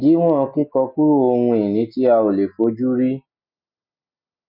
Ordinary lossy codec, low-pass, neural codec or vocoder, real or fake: none; 5.4 kHz; none; real